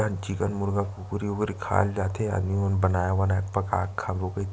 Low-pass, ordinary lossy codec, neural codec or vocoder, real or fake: none; none; none; real